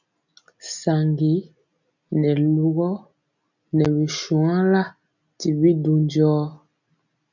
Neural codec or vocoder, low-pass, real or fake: none; 7.2 kHz; real